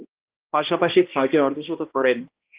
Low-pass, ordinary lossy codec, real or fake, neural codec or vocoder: 3.6 kHz; Opus, 32 kbps; fake; codec, 16 kHz, 1 kbps, X-Codec, HuBERT features, trained on balanced general audio